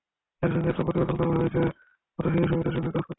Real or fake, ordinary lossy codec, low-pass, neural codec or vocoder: real; AAC, 16 kbps; 7.2 kHz; none